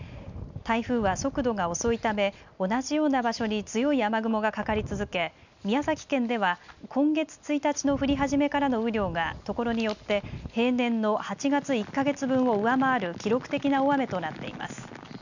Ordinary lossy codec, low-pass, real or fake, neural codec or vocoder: none; 7.2 kHz; real; none